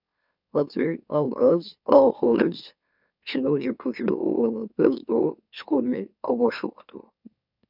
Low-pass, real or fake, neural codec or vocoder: 5.4 kHz; fake; autoencoder, 44.1 kHz, a latent of 192 numbers a frame, MeloTTS